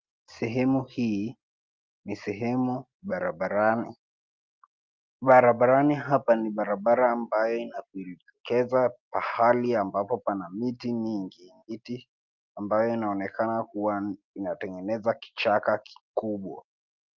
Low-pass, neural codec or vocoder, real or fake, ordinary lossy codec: 7.2 kHz; none; real; Opus, 24 kbps